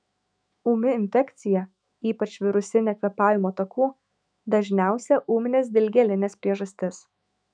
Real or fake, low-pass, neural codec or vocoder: fake; 9.9 kHz; autoencoder, 48 kHz, 128 numbers a frame, DAC-VAE, trained on Japanese speech